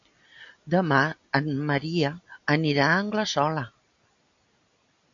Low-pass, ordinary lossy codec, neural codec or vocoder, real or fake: 7.2 kHz; AAC, 64 kbps; none; real